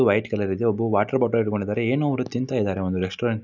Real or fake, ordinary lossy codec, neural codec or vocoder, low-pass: real; none; none; 7.2 kHz